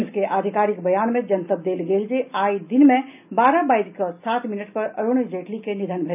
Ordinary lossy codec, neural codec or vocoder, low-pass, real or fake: none; none; 3.6 kHz; real